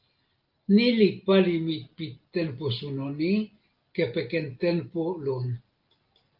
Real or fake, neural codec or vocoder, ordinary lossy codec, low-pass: real; none; Opus, 32 kbps; 5.4 kHz